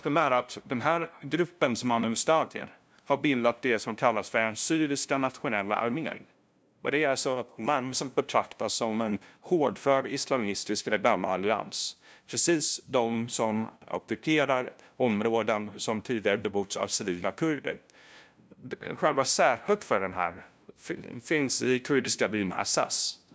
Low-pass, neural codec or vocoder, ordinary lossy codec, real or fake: none; codec, 16 kHz, 0.5 kbps, FunCodec, trained on LibriTTS, 25 frames a second; none; fake